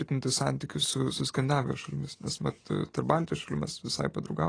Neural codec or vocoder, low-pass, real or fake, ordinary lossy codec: none; 9.9 kHz; real; AAC, 32 kbps